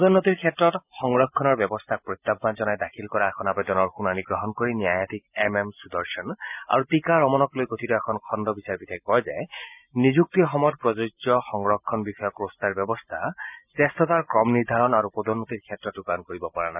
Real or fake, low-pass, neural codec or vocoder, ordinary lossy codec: real; 3.6 kHz; none; none